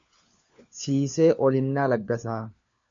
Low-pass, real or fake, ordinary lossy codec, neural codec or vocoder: 7.2 kHz; fake; MP3, 64 kbps; codec, 16 kHz, 4 kbps, FunCodec, trained on LibriTTS, 50 frames a second